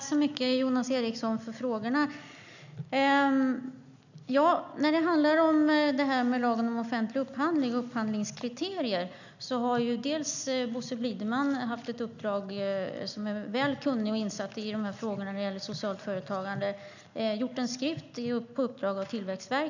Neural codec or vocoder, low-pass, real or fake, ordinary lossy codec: none; 7.2 kHz; real; none